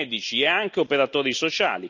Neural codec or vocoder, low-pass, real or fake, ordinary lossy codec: none; 7.2 kHz; real; none